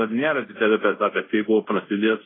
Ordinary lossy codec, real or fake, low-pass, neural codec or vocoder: AAC, 16 kbps; fake; 7.2 kHz; codec, 24 kHz, 0.5 kbps, DualCodec